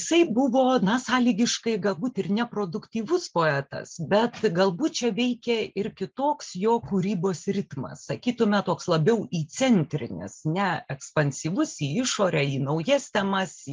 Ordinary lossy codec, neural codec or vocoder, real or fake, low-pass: Opus, 24 kbps; none; real; 7.2 kHz